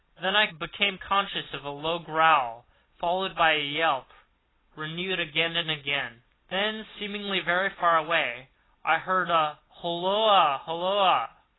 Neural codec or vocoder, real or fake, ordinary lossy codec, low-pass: none; real; AAC, 16 kbps; 7.2 kHz